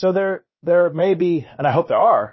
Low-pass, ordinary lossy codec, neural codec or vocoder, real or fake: 7.2 kHz; MP3, 24 kbps; codec, 16 kHz, about 1 kbps, DyCAST, with the encoder's durations; fake